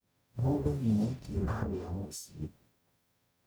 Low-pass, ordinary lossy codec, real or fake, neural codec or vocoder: none; none; fake; codec, 44.1 kHz, 0.9 kbps, DAC